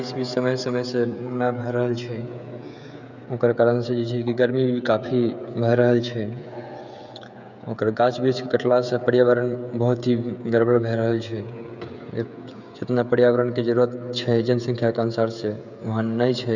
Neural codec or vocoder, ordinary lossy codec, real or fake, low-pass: codec, 16 kHz, 16 kbps, FreqCodec, smaller model; none; fake; 7.2 kHz